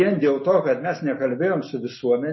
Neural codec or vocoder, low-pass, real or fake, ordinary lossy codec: none; 7.2 kHz; real; MP3, 24 kbps